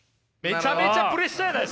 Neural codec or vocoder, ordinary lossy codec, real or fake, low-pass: none; none; real; none